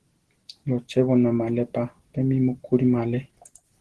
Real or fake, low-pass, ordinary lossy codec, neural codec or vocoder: real; 10.8 kHz; Opus, 16 kbps; none